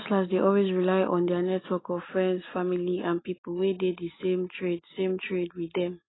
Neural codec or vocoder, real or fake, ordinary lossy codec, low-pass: none; real; AAC, 16 kbps; 7.2 kHz